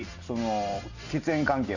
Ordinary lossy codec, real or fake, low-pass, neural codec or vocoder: none; real; 7.2 kHz; none